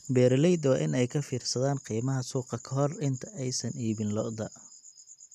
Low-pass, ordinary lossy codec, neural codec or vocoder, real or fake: 14.4 kHz; none; none; real